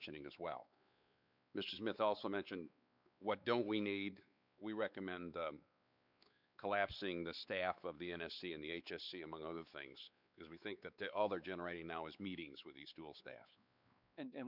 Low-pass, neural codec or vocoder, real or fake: 5.4 kHz; codec, 16 kHz, 4 kbps, X-Codec, WavLM features, trained on Multilingual LibriSpeech; fake